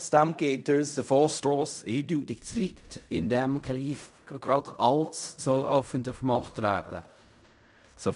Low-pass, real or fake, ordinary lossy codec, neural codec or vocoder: 10.8 kHz; fake; MP3, 96 kbps; codec, 16 kHz in and 24 kHz out, 0.4 kbps, LongCat-Audio-Codec, fine tuned four codebook decoder